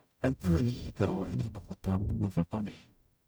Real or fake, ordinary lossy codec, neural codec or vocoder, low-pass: fake; none; codec, 44.1 kHz, 0.9 kbps, DAC; none